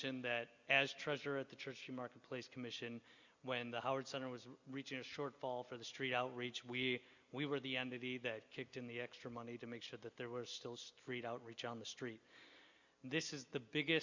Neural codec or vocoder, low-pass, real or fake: none; 7.2 kHz; real